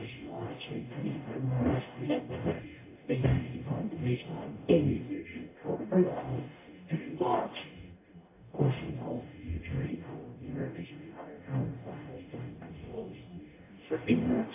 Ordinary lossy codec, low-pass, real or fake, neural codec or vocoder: AAC, 24 kbps; 3.6 kHz; fake; codec, 44.1 kHz, 0.9 kbps, DAC